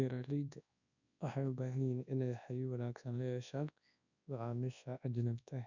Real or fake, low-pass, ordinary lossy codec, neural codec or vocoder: fake; 7.2 kHz; none; codec, 24 kHz, 0.9 kbps, WavTokenizer, large speech release